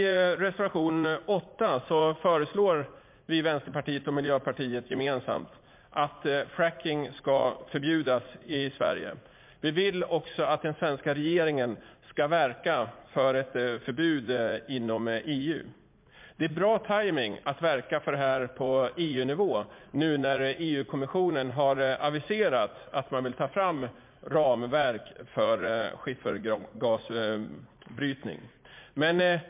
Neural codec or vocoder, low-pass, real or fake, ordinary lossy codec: vocoder, 44.1 kHz, 80 mel bands, Vocos; 3.6 kHz; fake; MP3, 32 kbps